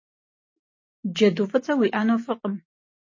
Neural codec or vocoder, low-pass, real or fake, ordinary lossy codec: none; 7.2 kHz; real; MP3, 32 kbps